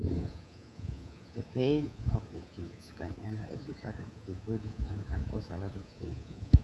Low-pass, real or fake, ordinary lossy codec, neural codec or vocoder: none; fake; none; codec, 24 kHz, 3.1 kbps, DualCodec